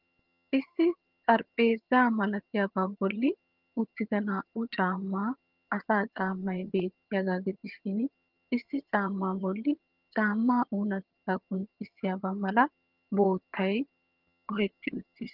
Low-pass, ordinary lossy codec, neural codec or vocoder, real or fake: 5.4 kHz; Opus, 24 kbps; vocoder, 22.05 kHz, 80 mel bands, HiFi-GAN; fake